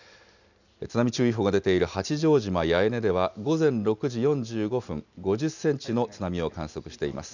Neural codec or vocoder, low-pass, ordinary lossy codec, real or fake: none; 7.2 kHz; none; real